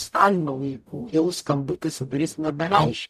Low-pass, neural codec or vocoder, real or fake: 14.4 kHz; codec, 44.1 kHz, 0.9 kbps, DAC; fake